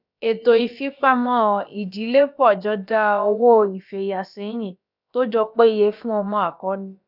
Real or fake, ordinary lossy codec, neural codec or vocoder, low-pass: fake; none; codec, 16 kHz, about 1 kbps, DyCAST, with the encoder's durations; 5.4 kHz